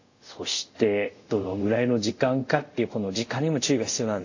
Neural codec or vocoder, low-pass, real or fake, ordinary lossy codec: codec, 24 kHz, 0.5 kbps, DualCodec; 7.2 kHz; fake; AAC, 48 kbps